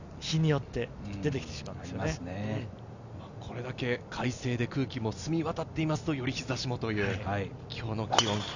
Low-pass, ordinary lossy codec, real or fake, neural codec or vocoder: 7.2 kHz; none; real; none